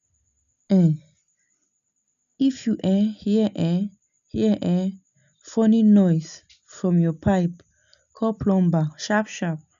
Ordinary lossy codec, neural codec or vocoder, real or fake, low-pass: MP3, 96 kbps; none; real; 7.2 kHz